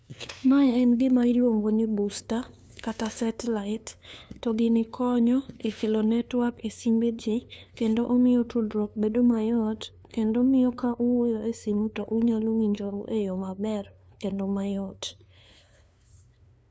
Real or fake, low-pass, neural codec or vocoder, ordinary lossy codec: fake; none; codec, 16 kHz, 2 kbps, FunCodec, trained on LibriTTS, 25 frames a second; none